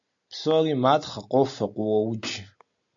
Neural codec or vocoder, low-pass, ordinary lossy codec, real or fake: none; 7.2 kHz; AAC, 64 kbps; real